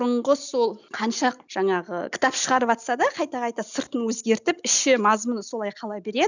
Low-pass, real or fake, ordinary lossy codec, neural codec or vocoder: 7.2 kHz; real; none; none